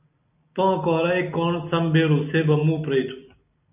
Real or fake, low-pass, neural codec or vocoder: real; 3.6 kHz; none